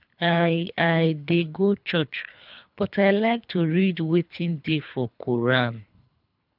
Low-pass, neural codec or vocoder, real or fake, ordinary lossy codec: 5.4 kHz; codec, 24 kHz, 3 kbps, HILCodec; fake; none